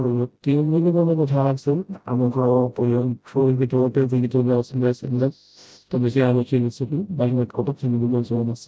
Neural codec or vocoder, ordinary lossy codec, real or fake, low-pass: codec, 16 kHz, 0.5 kbps, FreqCodec, smaller model; none; fake; none